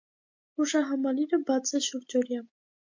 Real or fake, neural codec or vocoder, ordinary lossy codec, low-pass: real; none; MP3, 48 kbps; 7.2 kHz